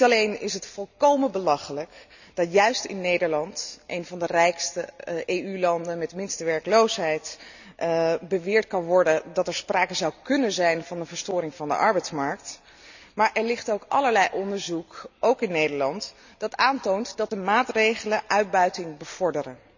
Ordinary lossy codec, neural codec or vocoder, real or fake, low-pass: none; none; real; 7.2 kHz